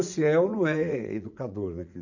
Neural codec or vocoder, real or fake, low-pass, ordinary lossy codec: vocoder, 44.1 kHz, 80 mel bands, Vocos; fake; 7.2 kHz; none